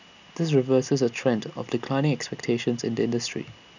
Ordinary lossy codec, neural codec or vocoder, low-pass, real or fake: none; none; 7.2 kHz; real